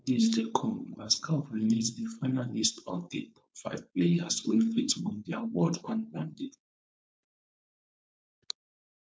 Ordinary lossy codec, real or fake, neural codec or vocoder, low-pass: none; fake; codec, 16 kHz, 4.8 kbps, FACodec; none